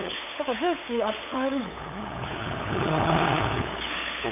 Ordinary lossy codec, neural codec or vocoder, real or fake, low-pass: none; codec, 16 kHz, 8 kbps, FunCodec, trained on LibriTTS, 25 frames a second; fake; 3.6 kHz